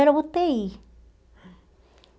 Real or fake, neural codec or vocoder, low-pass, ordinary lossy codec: real; none; none; none